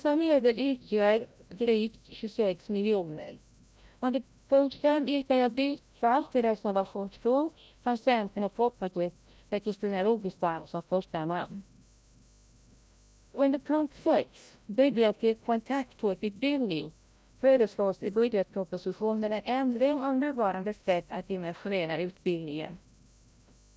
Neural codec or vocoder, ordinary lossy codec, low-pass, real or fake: codec, 16 kHz, 0.5 kbps, FreqCodec, larger model; none; none; fake